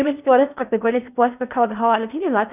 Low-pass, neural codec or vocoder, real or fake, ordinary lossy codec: 3.6 kHz; codec, 16 kHz in and 24 kHz out, 0.6 kbps, FocalCodec, streaming, 4096 codes; fake; none